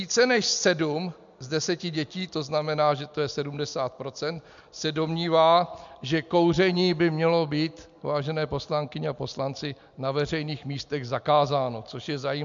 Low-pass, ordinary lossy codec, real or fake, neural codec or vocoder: 7.2 kHz; MP3, 64 kbps; real; none